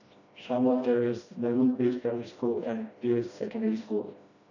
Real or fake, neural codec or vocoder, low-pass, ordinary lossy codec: fake; codec, 16 kHz, 1 kbps, FreqCodec, smaller model; 7.2 kHz; none